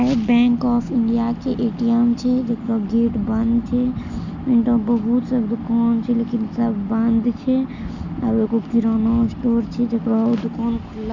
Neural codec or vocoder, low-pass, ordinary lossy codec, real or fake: none; 7.2 kHz; none; real